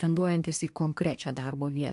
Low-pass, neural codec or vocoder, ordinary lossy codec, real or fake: 10.8 kHz; codec, 24 kHz, 1 kbps, SNAC; AAC, 64 kbps; fake